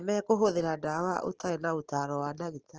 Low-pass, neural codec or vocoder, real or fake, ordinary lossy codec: 7.2 kHz; none; real; Opus, 16 kbps